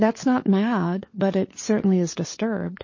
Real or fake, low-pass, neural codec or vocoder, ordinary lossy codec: fake; 7.2 kHz; codec, 16 kHz, 4 kbps, FunCodec, trained on LibriTTS, 50 frames a second; MP3, 32 kbps